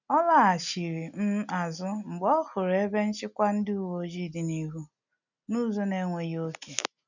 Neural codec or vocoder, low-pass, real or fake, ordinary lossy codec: none; 7.2 kHz; real; none